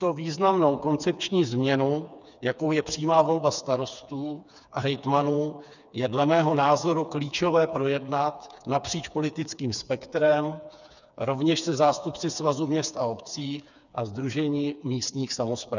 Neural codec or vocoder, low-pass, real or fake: codec, 16 kHz, 4 kbps, FreqCodec, smaller model; 7.2 kHz; fake